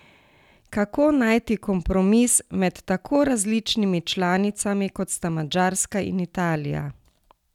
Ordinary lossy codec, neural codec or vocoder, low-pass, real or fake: none; none; 19.8 kHz; real